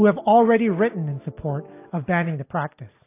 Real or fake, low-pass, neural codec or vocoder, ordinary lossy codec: real; 3.6 kHz; none; AAC, 24 kbps